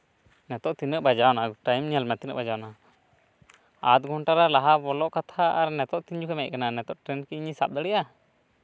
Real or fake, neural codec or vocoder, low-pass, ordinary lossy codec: real; none; none; none